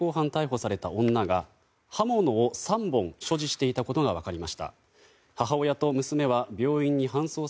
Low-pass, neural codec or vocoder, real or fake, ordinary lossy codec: none; none; real; none